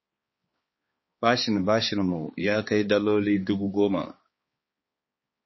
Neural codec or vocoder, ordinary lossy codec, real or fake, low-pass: codec, 16 kHz, 4 kbps, X-Codec, HuBERT features, trained on balanced general audio; MP3, 24 kbps; fake; 7.2 kHz